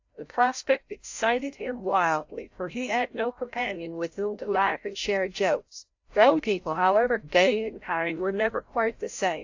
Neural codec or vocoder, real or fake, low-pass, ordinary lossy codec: codec, 16 kHz, 0.5 kbps, FreqCodec, larger model; fake; 7.2 kHz; AAC, 48 kbps